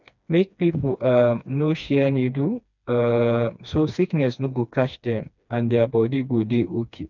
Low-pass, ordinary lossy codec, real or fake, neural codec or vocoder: 7.2 kHz; none; fake; codec, 16 kHz, 2 kbps, FreqCodec, smaller model